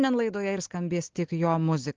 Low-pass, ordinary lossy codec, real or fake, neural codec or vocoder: 7.2 kHz; Opus, 16 kbps; real; none